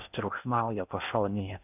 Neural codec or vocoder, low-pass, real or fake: codec, 16 kHz in and 24 kHz out, 0.6 kbps, FocalCodec, streaming, 4096 codes; 3.6 kHz; fake